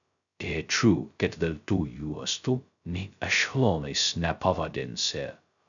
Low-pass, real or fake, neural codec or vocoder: 7.2 kHz; fake; codec, 16 kHz, 0.2 kbps, FocalCodec